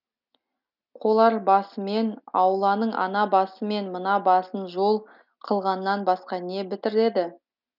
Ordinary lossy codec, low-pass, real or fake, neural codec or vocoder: none; 5.4 kHz; real; none